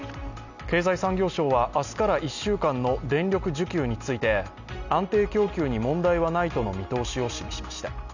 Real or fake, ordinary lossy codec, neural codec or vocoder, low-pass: real; none; none; 7.2 kHz